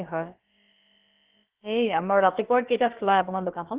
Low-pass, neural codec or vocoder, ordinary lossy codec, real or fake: 3.6 kHz; codec, 16 kHz, about 1 kbps, DyCAST, with the encoder's durations; Opus, 16 kbps; fake